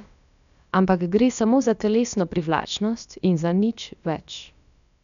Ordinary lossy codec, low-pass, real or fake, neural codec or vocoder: none; 7.2 kHz; fake; codec, 16 kHz, about 1 kbps, DyCAST, with the encoder's durations